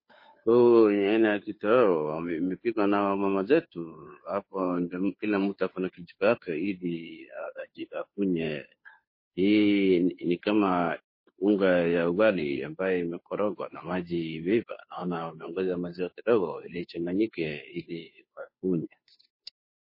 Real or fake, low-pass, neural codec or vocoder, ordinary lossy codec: fake; 5.4 kHz; codec, 16 kHz, 2 kbps, FunCodec, trained on Chinese and English, 25 frames a second; MP3, 24 kbps